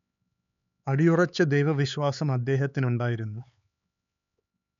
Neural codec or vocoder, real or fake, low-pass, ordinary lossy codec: codec, 16 kHz, 4 kbps, X-Codec, HuBERT features, trained on LibriSpeech; fake; 7.2 kHz; none